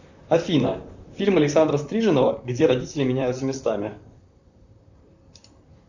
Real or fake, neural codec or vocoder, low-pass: fake; vocoder, 44.1 kHz, 128 mel bands, Pupu-Vocoder; 7.2 kHz